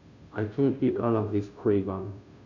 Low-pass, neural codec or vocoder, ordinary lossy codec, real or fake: 7.2 kHz; codec, 16 kHz, 0.5 kbps, FunCodec, trained on Chinese and English, 25 frames a second; none; fake